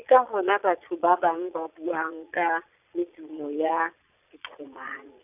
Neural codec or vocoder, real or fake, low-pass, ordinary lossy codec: vocoder, 22.05 kHz, 80 mel bands, Vocos; fake; 3.6 kHz; none